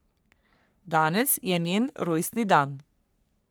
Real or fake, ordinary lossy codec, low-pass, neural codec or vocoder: fake; none; none; codec, 44.1 kHz, 3.4 kbps, Pupu-Codec